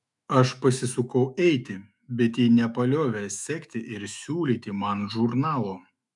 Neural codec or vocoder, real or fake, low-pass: autoencoder, 48 kHz, 128 numbers a frame, DAC-VAE, trained on Japanese speech; fake; 10.8 kHz